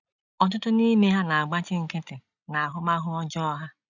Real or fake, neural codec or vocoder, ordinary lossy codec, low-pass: real; none; none; 7.2 kHz